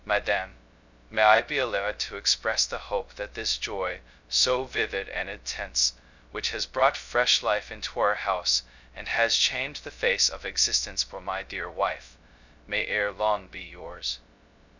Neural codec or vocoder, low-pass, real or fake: codec, 16 kHz, 0.2 kbps, FocalCodec; 7.2 kHz; fake